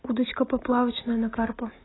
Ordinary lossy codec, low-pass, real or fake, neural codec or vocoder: AAC, 16 kbps; 7.2 kHz; real; none